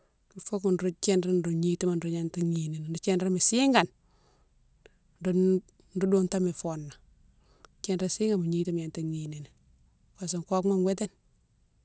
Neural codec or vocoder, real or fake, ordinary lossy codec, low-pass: none; real; none; none